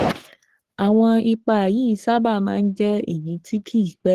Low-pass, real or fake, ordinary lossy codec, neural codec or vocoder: 14.4 kHz; fake; Opus, 16 kbps; codec, 44.1 kHz, 3.4 kbps, Pupu-Codec